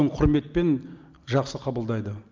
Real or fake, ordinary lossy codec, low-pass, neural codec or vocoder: real; Opus, 24 kbps; 7.2 kHz; none